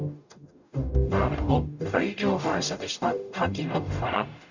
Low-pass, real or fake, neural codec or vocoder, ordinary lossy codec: 7.2 kHz; fake; codec, 44.1 kHz, 0.9 kbps, DAC; none